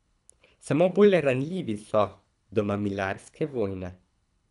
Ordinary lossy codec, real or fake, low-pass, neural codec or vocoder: none; fake; 10.8 kHz; codec, 24 kHz, 3 kbps, HILCodec